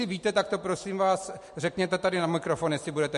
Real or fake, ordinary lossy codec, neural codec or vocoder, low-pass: real; MP3, 48 kbps; none; 14.4 kHz